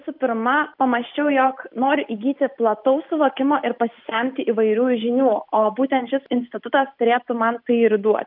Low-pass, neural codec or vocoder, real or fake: 5.4 kHz; none; real